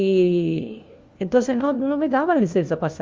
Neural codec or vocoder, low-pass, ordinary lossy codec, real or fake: codec, 16 kHz, 1 kbps, FunCodec, trained on LibriTTS, 50 frames a second; 7.2 kHz; Opus, 32 kbps; fake